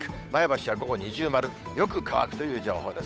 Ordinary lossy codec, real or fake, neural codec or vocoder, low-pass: none; fake; codec, 16 kHz, 8 kbps, FunCodec, trained on Chinese and English, 25 frames a second; none